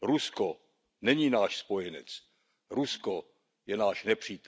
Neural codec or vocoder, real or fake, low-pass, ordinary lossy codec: none; real; none; none